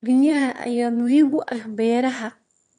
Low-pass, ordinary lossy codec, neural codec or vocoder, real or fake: 9.9 kHz; MP3, 64 kbps; autoencoder, 22.05 kHz, a latent of 192 numbers a frame, VITS, trained on one speaker; fake